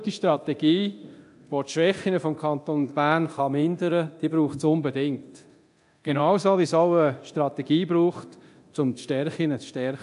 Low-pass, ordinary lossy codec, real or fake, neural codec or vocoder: 10.8 kHz; AAC, 64 kbps; fake; codec, 24 kHz, 0.9 kbps, DualCodec